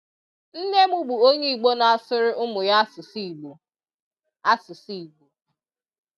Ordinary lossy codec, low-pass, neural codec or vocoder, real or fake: none; none; none; real